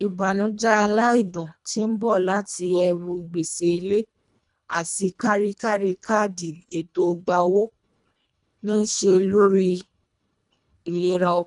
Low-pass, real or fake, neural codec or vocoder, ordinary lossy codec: 10.8 kHz; fake; codec, 24 kHz, 1.5 kbps, HILCodec; none